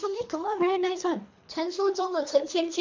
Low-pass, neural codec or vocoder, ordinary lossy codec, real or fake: 7.2 kHz; codec, 24 kHz, 3 kbps, HILCodec; MP3, 64 kbps; fake